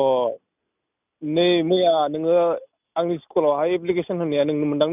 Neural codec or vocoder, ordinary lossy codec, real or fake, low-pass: none; none; real; 3.6 kHz